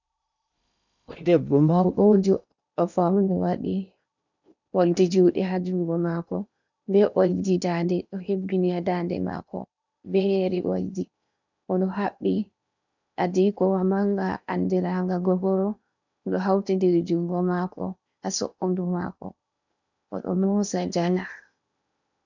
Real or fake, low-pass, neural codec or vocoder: fake; 7.2 kHz; codec, 16 kHz in and 24 kHz out, 0.8 kbps, FocalCodec, streaming, 65536 codes